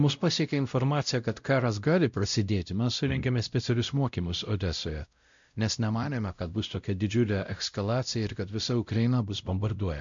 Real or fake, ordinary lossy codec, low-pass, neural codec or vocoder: fake; MP3, 64 kbps; 7.2 kHz; codec, 16 kHz, 0.5 kbps, X-Codec, WavLM features, trained on Multilingual LibriSpeech